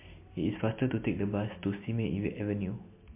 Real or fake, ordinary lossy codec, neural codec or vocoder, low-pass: real; MP3, 24 kbps; none; 3.6 kHz